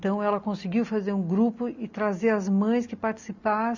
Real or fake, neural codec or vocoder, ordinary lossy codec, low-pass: real; none; none; 7.2 kHz